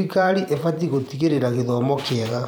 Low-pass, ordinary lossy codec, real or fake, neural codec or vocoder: none; none; fake; vocoder, 44.1 kHz, 128 mel bands every 512 samples, BigVGAN v2